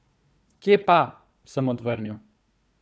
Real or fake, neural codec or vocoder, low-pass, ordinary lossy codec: fake; codec, 16 kHz, 4 kbps, FunCodec, trained on Chinese and English, 50 frames a second; none; none